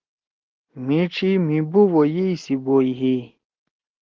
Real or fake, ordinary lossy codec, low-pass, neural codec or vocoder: real; Opus, 16 kbps; 7.2 kHz; none